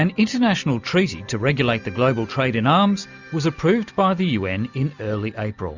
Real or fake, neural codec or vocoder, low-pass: real; none; 7.2 kHz